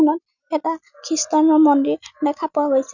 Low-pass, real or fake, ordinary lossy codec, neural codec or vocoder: 7.2 kHz; real; none; none